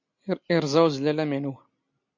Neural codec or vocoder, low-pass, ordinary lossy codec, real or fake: none; 7.2 kHz; MP3, 48 kbps; real